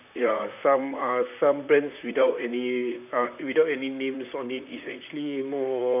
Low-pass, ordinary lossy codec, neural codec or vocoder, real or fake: 3.6 kHz; none; vocoder, 44.1 kHz, 128 mel bands, Pupu-Vocoder; fake